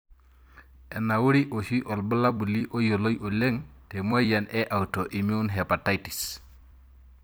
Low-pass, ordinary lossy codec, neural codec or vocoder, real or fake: none; none; vocoder, 44.1 kHz, 128 mel bands every 256 samples, BigVGAN v2; fake